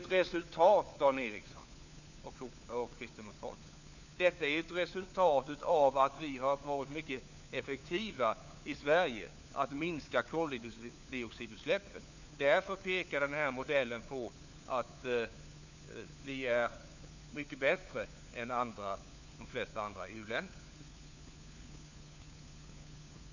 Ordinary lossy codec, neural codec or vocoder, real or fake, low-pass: none; codec, 16 kHz, 4 kbps, FunCodec, trained on LibriTTS, 50 frames a second; fake; 7.2 kHz